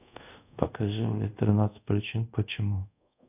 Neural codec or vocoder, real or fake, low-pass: codec, 24 kHz, 0.5 kbps, DualCodec; fake; 3.6 kHz